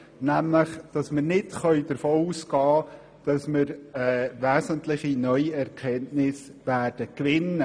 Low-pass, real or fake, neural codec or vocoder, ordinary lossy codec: 9.9 kHz; real; none; none